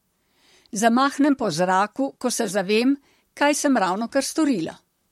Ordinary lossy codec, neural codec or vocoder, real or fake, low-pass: MP3, 64 kbps; vocoder, 44.1 kHz, 128 mel bands, Pupu-Vocoder; fake; 19.8 kHz